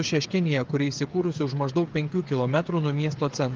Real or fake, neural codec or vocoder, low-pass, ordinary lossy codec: fake; codec, 16 kHz, 8 kbps, FreqCodec, smaller model; 7.2 kHz; Opus, 32 kbps